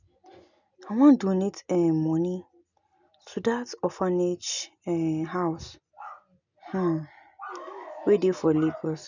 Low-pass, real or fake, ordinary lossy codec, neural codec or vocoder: 7.2 kHz; real; none; none